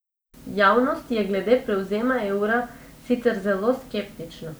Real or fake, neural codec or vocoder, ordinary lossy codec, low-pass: real; none; none; none